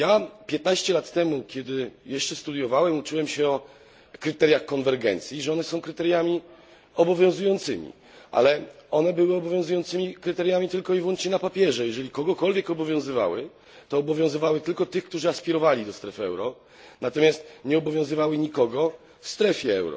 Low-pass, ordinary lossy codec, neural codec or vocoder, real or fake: none; none; none; real